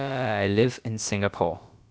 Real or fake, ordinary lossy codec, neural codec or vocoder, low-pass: fake; none; codec, 16 kHz, about 1 kbps, DyCAST, with the encoder's durations; none